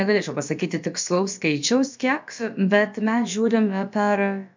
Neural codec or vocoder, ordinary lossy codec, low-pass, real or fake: codec, 16 kHz, about 1 kbps, DyCAST, with the encoder's durations; MP3, 64 kbps; 7.2 kHz; fake